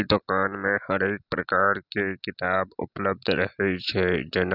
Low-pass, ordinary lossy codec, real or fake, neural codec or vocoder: 5.4 kHz; none; real; none